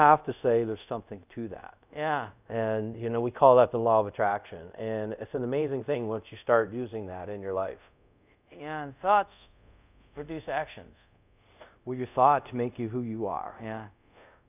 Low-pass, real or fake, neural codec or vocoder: 3.6 kHz; fake; codec, 24 kHz, 0.5 kbps, DualCodec